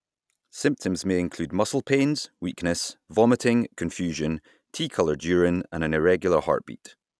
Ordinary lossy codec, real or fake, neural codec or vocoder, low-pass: none; real; none; none